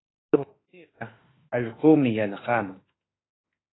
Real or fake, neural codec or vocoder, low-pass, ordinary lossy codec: fake; autoencoder, 48 kHz, 32 numbers a frame, DAC-VAE, trained on Japanese speech; 7.2 kHz; AAC, 16 kbps